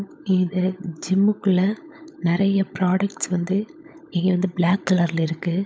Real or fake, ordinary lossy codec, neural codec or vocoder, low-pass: fake; none; codec, 16 kHz, 16 kbps, FunCodec, trained on LibriTTS, 50 frames a second; none